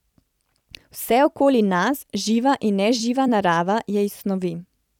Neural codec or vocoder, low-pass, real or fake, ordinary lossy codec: vocoder, 44.1 kHz, 128 mel bands every 256 samples, BigVGAN v2; 19.8 kHz; fake; none